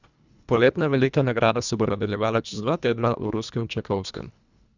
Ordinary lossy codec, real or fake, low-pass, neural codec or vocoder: Opus, 64 kbps; fake; 7.2 kHz; codec, 24 kHz, 1.5 kbps, HILCodec